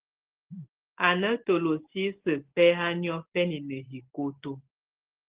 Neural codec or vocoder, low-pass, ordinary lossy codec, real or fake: none; 3.6 kHz; Opus, 16 kbps; real